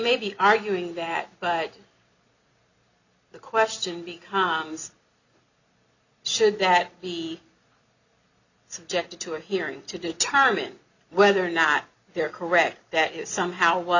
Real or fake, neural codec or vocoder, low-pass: real; none; 7.2 kHz